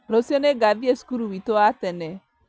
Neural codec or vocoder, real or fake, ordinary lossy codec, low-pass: none; real; none; none